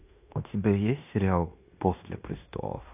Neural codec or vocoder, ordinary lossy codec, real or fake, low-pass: codec, 16 kHz in and 24 kHz out, 0.9 kbps, LongCat-Audio-Codec, fine tuned four codebook decoder; AAC, 32 kbps; fake; 3.6 kHz